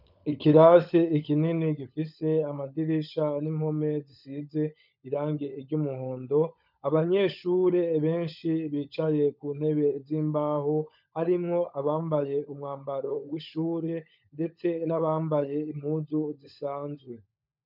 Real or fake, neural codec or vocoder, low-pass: fake; codec, 16 kHz, 16 kbps, FunCodec, trained on LibriTTS, 50 frames a second; 5.4 kHz